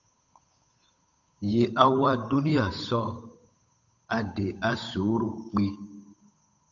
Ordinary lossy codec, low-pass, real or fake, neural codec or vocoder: AAC, 64 kbps; 7.2 kHz; fake; codec, 16 kHz, 8 kbps, FunCodec, trained on Chinese and English, 25 frames a second